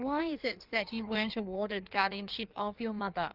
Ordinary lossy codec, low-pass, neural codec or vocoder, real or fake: Opus, 24 kbps; 5.4 kHz; codec, 16 kHz in and 24 kHz out, 1.1 kbps, FireRedTTS-2 codec; fake